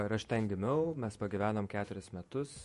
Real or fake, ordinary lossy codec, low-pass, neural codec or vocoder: fake; MP3, 48 kbps; 14.4 kHz; vocoder, 48 kHz, 128 mel bands, Vocos